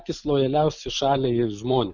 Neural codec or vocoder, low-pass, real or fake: none; 7.2 kHz; real